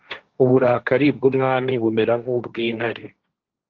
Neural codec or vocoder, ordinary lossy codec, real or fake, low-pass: codec, 16 kHz, 1.1 kbps, Voila-Tokenizer; Opus, 32 kbps; fake; 7.2 kHz